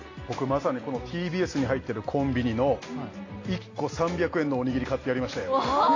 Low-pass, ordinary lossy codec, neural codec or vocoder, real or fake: 7.2 kHz; AAC, 32 kbps; none; real